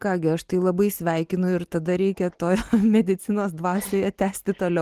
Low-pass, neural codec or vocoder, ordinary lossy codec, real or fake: 14.4 kHz; none; Opus, 24 kbps; real